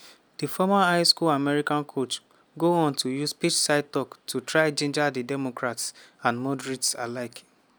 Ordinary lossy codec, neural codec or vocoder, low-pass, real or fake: none; none; none; real